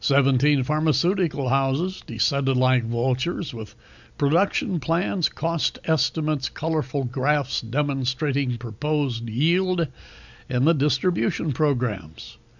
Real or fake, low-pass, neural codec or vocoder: real; 7.2 kHz; none